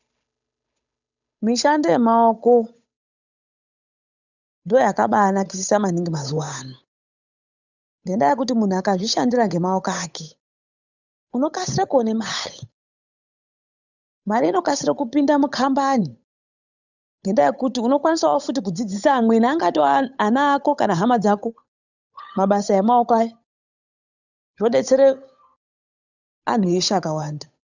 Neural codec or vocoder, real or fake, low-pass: codec, 16 kHz, 8 kbps, FunCodec, trained on Chinese and English, 25 frames a second; fake; 7.2 kHz